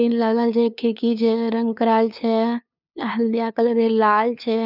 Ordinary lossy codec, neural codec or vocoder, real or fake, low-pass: none; codec, 16 kHz, 2 kbps, FunCodec, trained on LibriTTS, 25 frames a second; fake; 5.4 kHz